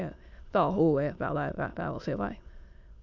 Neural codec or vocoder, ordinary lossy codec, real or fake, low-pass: autoencoder, 22.05 kHz, a latent of 192 numbers a frame, VITS, trained on many speakers; Opus, 64 kbps; fake; 7.2 kHz